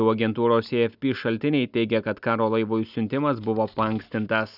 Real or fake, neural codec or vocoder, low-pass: real; none; 5.4 kHz